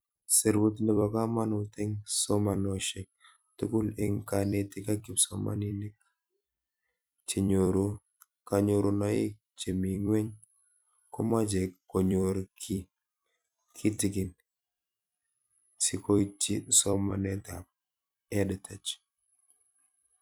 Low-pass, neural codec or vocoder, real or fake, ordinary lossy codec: none; vocoder, 44.1 kHz, 128 mel bands every 256 samples, BigVGAN v2; fake; none